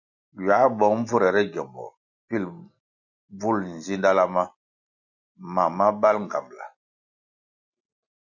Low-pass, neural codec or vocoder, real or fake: 7.2 kHz; none; real